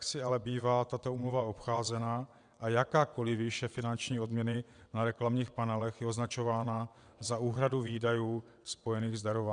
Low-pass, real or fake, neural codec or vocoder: 9.9 kHz; fake; vocoder, 22.05 kHz, 80 mel bands, WaveNeXt